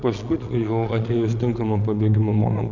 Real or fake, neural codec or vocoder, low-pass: fake; vocoder, 22.05 kHz, 80 mel bands, Vocos; 7.2 kHz